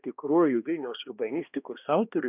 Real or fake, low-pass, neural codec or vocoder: fake; 3.6 kHz; codec, 16 kHz, 1 kbps, X-Codec, HuBERT features, trained on balanced general audio